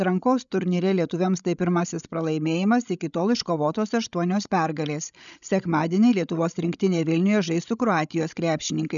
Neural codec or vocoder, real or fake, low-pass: codec, 16 kHz, 16 kbps, FreqCodec, larger model; fake; 7.2 kHz